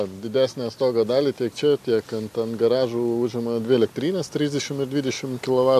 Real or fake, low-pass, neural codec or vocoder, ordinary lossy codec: real; 14.4 kHz; none; AAC, 96 kbps